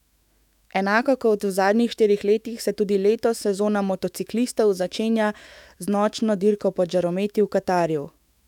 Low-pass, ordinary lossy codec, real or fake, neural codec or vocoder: 19.8 kHz; none; fake; autoencoder, 48 kHz, 128 numbers a frame, DAC-VAE, trained on Japanese speech